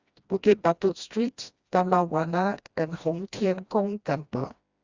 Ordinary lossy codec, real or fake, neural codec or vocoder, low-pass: Opus, 64 kbps; fake; codec, 16 kHz, 1 kbps, FreqCodec, smaller model; 7.2 kHz